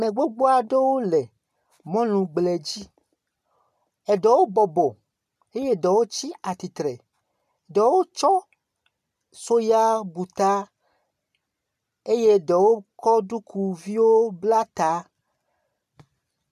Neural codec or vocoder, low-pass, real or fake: none; 14.4 kHz; real